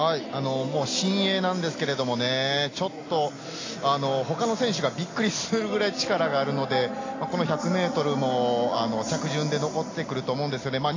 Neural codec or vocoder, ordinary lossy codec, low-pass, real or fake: none; AAC, 32 kbps; 7.2 kHz; real